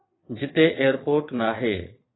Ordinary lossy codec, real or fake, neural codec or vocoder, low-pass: AAC, 16 kbps; fake; vocoder, 22.05 kHz, 80 mel bands, Vocos; 7.2 kHz